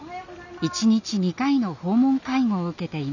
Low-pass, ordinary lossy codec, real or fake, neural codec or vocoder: 7.2 kHz; none; real; none